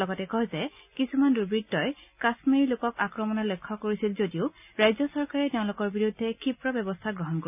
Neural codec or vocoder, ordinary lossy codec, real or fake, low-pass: none; none; real; 3.6 kHz